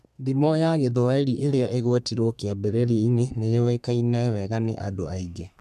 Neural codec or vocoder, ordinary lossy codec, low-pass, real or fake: codec, 32 kHz, 1.9 kbps, SNAC; MP3, 96 kbps; 14.4 kHz; fake